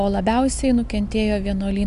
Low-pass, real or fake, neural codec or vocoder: 10.8 kHz; real; none